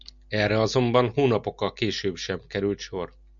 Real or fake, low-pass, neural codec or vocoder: real; 7.2 kHz; none